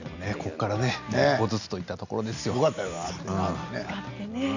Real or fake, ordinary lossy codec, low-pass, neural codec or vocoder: real; none; 7.2 kHz; none